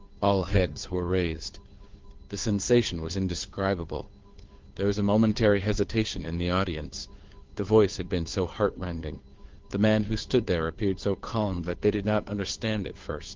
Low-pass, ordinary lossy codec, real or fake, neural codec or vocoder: 7.2 kHz; Opus, 32 kbps; fake; codec, 16 kHz, 2 kbps, FunCodec, trained on Chinese and English, 25 frames a second